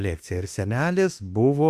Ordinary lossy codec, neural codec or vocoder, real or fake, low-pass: Opus, 64 kbps; autoencoder, 48 kHz, 32 numbers a frame, DAC-VAE, trained on Japanese speech; fake; 14.4 kHz